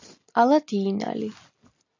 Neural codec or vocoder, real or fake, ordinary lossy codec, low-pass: none; real; AAC, 48 kbps; 7.2 kHz